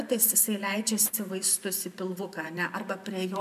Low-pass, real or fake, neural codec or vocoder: 14.4 kHz; fake; vocoder, 44.1 kHz, 128 mel bands every 512 samples, BigVGAN v2